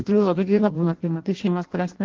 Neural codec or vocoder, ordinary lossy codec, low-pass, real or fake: codec, 16 kHz in and 24 kHz out, 0.6 kbps, FireRedTTS-2 codec; Opus, 16 kbps; 7.2 kHz; fake